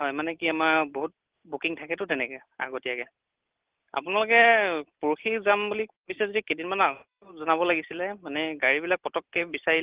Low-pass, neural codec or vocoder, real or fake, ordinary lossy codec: 3.6 kHz; none; real; Opus, 16 kbps